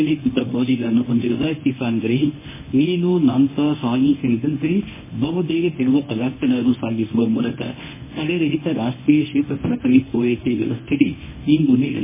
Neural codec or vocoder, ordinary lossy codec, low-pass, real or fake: codec, 24 kHz, 0.9 kbps, WavTokenizer, medium speech release version 1; MP3, 16 kbps; 3.6 kHz; fake